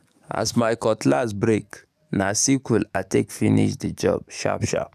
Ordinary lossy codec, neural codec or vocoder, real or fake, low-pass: none; codec, 44.1 kHz, 7.8 kbps, DAC; fake; 14.4 kHz